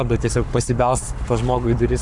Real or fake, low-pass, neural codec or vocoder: fake; 10.8 kHz; codec, 44.1 kHz, 7.8 kbps, Pupu-Codec